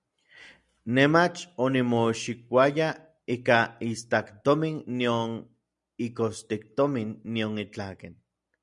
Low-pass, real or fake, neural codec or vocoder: 10.8 kHz; real; none